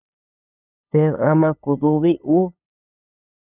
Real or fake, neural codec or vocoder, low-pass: fake; codec, 16 kHz, 8 kbps, FunCodec, trained on LibriTTS, 25 frames a second; 3.6 kHz